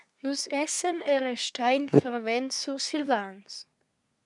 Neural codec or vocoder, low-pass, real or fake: codec, 24 kHz, 1 kbps, SNAC; 10.8 kHz; fake